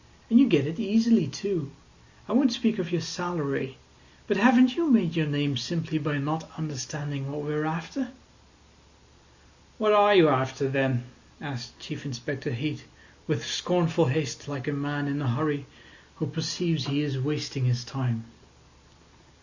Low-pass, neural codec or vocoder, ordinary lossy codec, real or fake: 7.2 kHz; none; Opus, 64 kbps; real